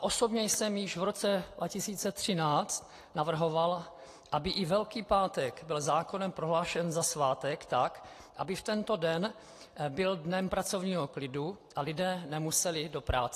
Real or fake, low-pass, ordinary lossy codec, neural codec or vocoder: real; 14.4 kHz; AAC, 48 kbps; none